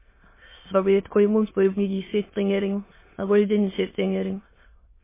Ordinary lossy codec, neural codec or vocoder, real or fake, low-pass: MP3, 16 kbps; autoencoder, 22.05 kHz, a latent of 192 numbers a frame, VITS, trained on many speakers; fake; 3.6 kHz